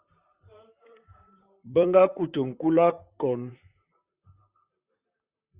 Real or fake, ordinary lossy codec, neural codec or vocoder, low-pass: fake; Opus, 64 kbps; vocoder, 44.1 kHz, 128 mel bands, Pupu-Vocoder; 3.6 kHz